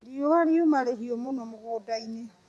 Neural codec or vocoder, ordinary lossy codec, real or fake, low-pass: vocoder, 24 kHz, 100 mel bands, Vocos; none; fake; none